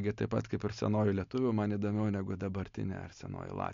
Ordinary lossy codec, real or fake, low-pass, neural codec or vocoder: MP3, 48 kbps; real; 7.2 kHz; none